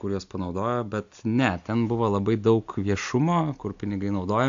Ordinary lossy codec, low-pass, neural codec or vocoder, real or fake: AAC, 64 kbps; 7.2 kHz; none; real